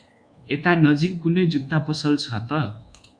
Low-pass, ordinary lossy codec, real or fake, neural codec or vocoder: 9.9 kHz; Opus, 64 kbps; fake; codec, 24 kHz, 1.2 kbps, DualCodec